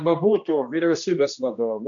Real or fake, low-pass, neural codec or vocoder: fake; 7.2 kHz; codec, 16 kHz, 1 kbps, X-Codec, HuBERT features, trained on general audio